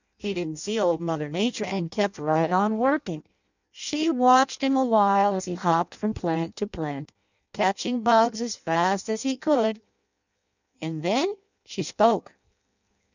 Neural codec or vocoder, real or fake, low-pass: codec, 16 kHz in and 24 kHz out, 0.6 kbps, FireRedTTS-2 codec; fake; 7.2 kHz